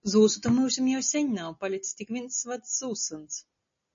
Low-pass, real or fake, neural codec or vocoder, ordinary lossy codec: 7.2 kHz; real; none; MP3, 32 kbps